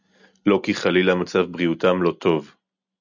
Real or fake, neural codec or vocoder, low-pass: real; none; 7.2 kHz